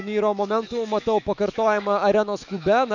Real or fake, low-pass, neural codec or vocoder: fake; 7.2 kHz; autoencoder, 48 kHz, 128 numbers a frame, DAC-VAE, trained on Japanese speech